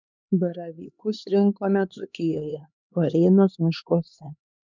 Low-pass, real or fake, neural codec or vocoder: 7.2 kHz; fake; codec, 16 kHz, 2 kbps, X-Codec, HuBERT features, trained on LibriSpeech